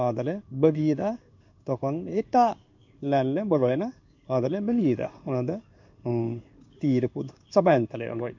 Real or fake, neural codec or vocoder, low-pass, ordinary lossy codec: fake; codec, 16 kHz in and 24 kHz out, 1 kbps, XY-Tokenizer; 7.2 kHz; MP3, 64 kbps